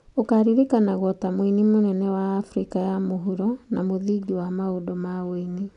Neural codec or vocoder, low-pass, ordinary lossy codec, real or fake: none; 10.8 kHz; none; real